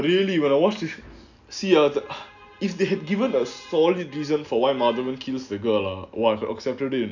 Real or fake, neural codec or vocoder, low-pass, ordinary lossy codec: real; none; 7.2 kHz; none